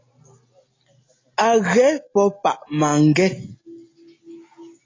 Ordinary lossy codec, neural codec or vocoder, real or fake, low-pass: AAC, 32 kbps; none; real; 7.2 kHz